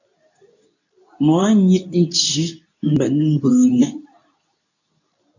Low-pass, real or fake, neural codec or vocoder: 7.2 kHz; fake; codec, 24 kHz, 0.9 kbps, WavTokenizer, medium speech release version 2